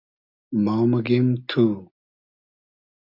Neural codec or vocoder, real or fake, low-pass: none; real; 5.4 kHz